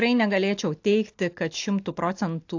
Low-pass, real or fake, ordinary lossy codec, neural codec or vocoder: 7.2 kHz; real; AAC, 48 kbps; none